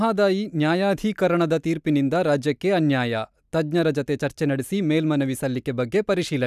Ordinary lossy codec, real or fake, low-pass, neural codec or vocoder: none; real; 14.4 kHz; none